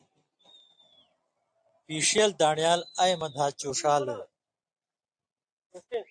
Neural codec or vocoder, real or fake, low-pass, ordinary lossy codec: none; real; 9.9 kHz; AAC, 64 kbps